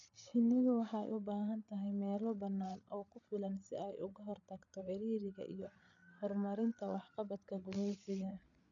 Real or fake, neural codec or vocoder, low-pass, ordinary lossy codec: fake; codec, 16 kHz, 8 kbps, FreqCodec, larger model; 7.2 kHz; none